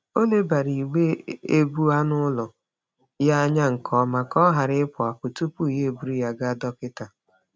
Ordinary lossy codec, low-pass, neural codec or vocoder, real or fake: none; none; none; real